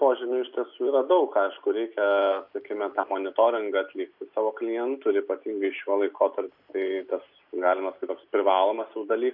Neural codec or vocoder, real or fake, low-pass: none; real; 5.4 kHz